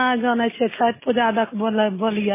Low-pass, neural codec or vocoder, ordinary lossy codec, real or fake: 3.6 kHz; none; MP3, 16 kbps; real